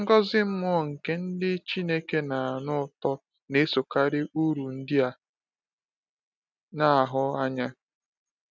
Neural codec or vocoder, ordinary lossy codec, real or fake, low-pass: none; none; real; none